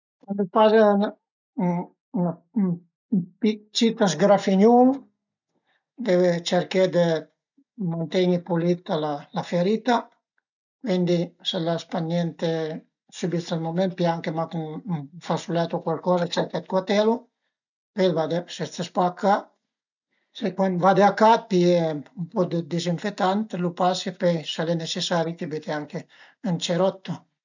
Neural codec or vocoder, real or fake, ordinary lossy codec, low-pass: none; real; none; 7.2 kHz